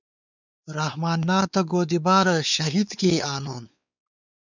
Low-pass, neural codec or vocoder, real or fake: 7.2 kHz; codec, 16 kHz, 4 kbps, X-Codec, WavLM features, trained on Multilingual LibriSpeech; fake